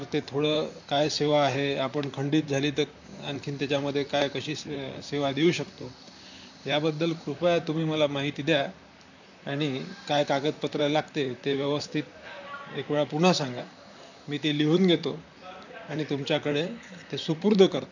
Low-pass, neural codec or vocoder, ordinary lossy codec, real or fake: 7.2 kHz; vocoder, 44.1 kHz, 128 mel bands, Pupu-Vocoder; none; fake